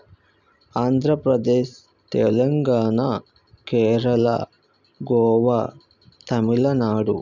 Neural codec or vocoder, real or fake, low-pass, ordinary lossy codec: none; real; 7.2 kHz; none